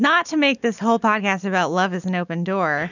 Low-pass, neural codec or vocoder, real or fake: 7.2 kHz; none; real